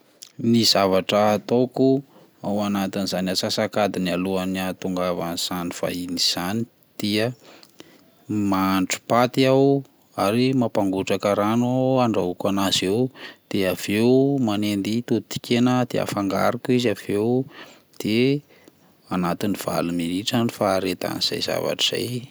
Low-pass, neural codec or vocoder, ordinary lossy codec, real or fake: none; none; none; real